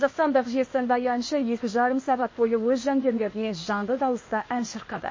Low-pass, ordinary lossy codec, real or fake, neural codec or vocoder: 7.2 kHz; MP3, 32 kbps; fake; codec, 16 kHz, 0.8 kbps, ZipCodec